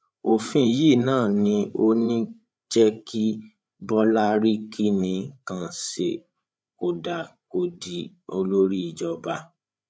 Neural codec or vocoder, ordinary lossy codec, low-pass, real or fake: codec, 16 kHz, 8 kbps, FreqCodec, larger model; none; none; fake